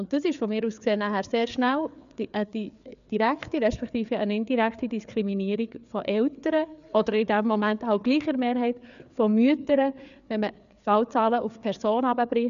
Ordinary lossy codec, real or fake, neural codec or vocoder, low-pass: none; fake; codec, 16 kHz, 4 kbps, FreqCodec, larger model; 7.2 kHz